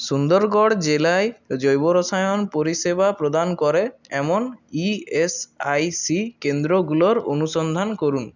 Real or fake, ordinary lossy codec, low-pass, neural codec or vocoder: real; none; 7.2 kHz; none